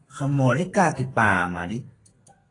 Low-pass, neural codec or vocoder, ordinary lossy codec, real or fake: 10.8 kHz; codec, 32 kHz, 1.9 kbps, SNAC; AAC, 32 kbps; fake